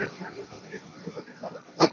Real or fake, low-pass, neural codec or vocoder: fake; 7.2 kHz; codec, 16 kHz, 1.1 kbps, Voila-Tokenizer